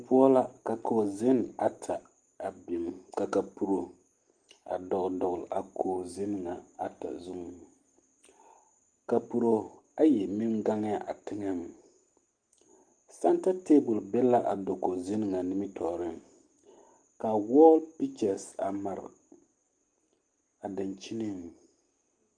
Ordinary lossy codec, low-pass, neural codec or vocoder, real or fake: Opus, 24 kbps; 9.9 kHz; none; real